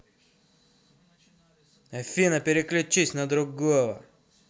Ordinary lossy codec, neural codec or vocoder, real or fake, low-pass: none; none; real; none